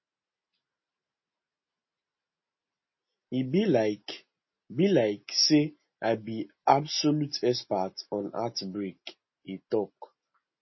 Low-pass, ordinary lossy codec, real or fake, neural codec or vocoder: 7.2 kHz; MP3, 24 kbps; real; none